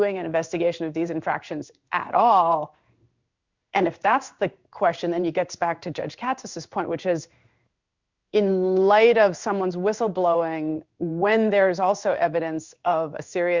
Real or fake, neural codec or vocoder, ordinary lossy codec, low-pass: fake; codec, 16 kHz in and 24 kHz out, 1 kbps, XY-Tokenizer; Opus, 64 kbps; 7.2 kHz